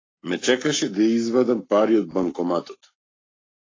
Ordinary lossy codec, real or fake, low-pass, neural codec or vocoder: AAC, 32 kbps; fake; 7.2 kHz; codec, 44.1 kHz, 7.8 kbps, Pupu-Codec